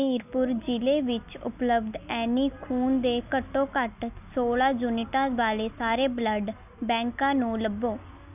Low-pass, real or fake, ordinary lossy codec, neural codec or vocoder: 3.6 kHz; real; none; none